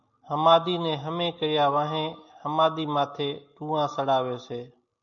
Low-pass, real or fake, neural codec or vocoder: 7.2 kHz; real; none